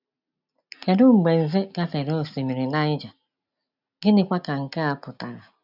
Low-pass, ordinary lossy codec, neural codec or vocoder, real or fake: 5.4 kHz; none; none; real